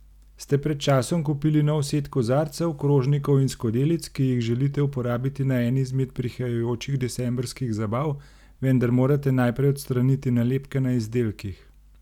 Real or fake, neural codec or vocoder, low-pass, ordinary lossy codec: real; none; 19.8 kHz; none